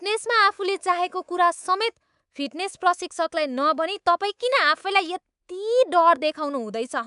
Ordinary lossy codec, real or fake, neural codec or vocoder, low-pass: none; fake; codec, 24 kHz, 3.1 kbps, DualCodec; 10.8 kHz